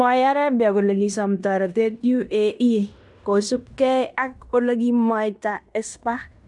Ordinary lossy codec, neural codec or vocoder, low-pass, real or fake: none; codec, 16 kHz in and 24 kHz out, 0.9 kbps, LongCat-Audio-Codec, fine tuned four codebook decoder; 10.8 kHz; fake